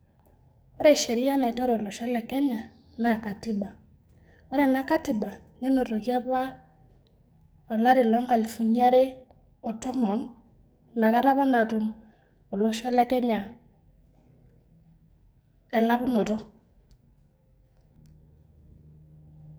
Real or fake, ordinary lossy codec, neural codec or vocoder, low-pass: fake; none; codec, 44.1 kHz, 2.6 kbps, SNAC; none